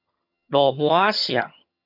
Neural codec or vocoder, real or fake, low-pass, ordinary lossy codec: vocoder, 22.05 kHz, 80 mel bands, HiFi-GAN; fake; 5.4 kHz; AAC, 48 kbps